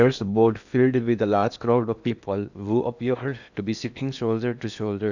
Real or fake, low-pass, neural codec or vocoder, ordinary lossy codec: fake; 7.2 kHz; codec, 16 kHz in and 24 kHz out, 0.8 kbps, FocalCodec, streaming, 65536 codes; none